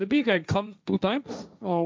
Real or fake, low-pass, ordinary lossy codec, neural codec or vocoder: fake; none; none; codec, 16 kHz, 1.1 kbps, Voila-Tokenizer